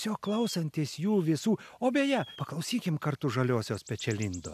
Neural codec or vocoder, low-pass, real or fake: none; 14.4 kHz; real